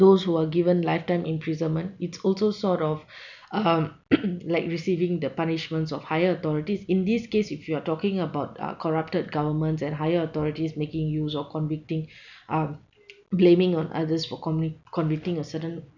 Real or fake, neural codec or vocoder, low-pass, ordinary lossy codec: real; none; 7.2 kHz; none